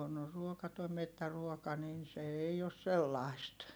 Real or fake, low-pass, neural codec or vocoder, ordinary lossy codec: real; none; none; none